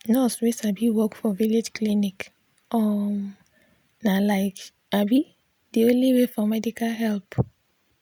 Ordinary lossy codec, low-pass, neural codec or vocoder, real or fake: none; none; none; real